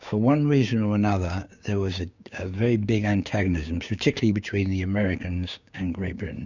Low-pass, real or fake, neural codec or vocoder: 7.2 kHz; fake; codec, 16 kHz, 6 kbps, DAC